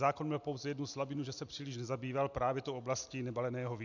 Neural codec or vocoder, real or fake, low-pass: none; real; 7.2 kHz